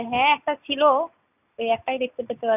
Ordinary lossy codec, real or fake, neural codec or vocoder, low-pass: none; real; none; 3.6 kHz